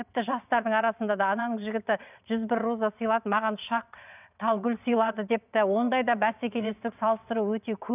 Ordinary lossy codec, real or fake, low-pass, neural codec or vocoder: none; fake; 3.6 kHz; vocoder, 22.05 kHz, 80 mel bands, WaveNeXt